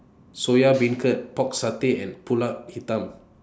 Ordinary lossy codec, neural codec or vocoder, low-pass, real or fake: none; none; none; real